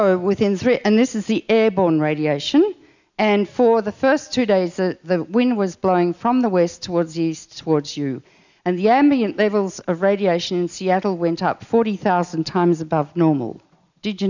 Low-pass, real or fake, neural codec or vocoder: 7.2 kHz; real; none